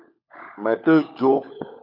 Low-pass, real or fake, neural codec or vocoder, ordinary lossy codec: 5.4 kHz; fake; codec, 16 kHz, 16 kbps, FunCodec, trained on LibriTTS, 50 frames a second; MP3, 48 kbps